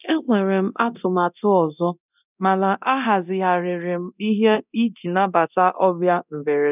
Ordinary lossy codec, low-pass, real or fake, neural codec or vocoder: none; 3.6 kHz; fake; codec, 24 kHz, 0.9 kbps, DualCodec